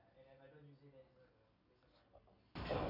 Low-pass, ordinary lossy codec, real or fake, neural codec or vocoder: 5.4 kHz; none; real; none